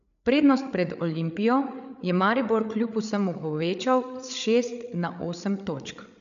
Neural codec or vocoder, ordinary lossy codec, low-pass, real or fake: codec, 16 kHz, 8 kbps, FreqCodec, larger model; none; 7.2 kHz; fake